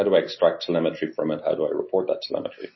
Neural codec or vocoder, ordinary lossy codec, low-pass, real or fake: none; MP3, 24 kbps; 7.2 kHz; real